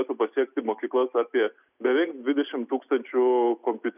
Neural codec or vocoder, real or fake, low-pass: none; real; 3.6 kHz